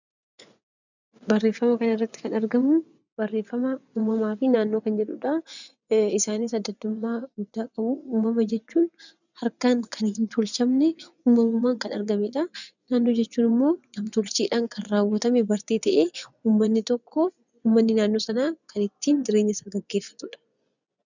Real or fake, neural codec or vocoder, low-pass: fake; vocoder, 24 kHz, 100 mel bands, Vocos; 7.2 kHz